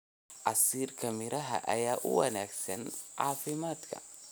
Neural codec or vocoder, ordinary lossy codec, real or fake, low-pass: none; none; real; none